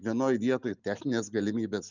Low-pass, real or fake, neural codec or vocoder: 7.2 kHz; real; none